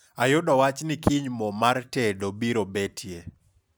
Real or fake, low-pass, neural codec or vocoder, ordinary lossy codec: real; none; none; none